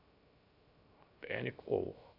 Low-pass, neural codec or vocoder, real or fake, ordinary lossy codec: 5.4 kHz; codec, 16 kHz, 0.3 kbps, FocalCodec; fake; none